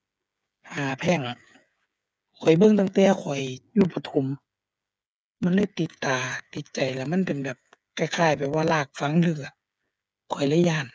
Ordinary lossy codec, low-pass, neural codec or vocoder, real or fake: none; none; codec, 16 kHz, 8 kbps, FreqCodec, smaller model; fake